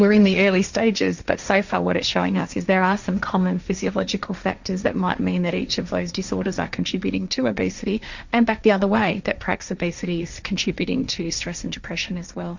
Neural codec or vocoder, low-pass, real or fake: codec, 16 kHz, 1.1 kbps, Voila-Tokenizer; 7.2 kHz; fake